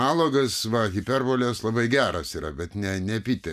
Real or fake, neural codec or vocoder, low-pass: real; none; 14.4 kHz